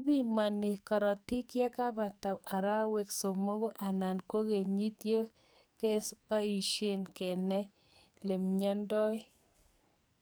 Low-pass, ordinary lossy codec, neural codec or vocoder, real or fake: none; none; codec, 44.1 kHz, 2.6 kbps, SNAC; fake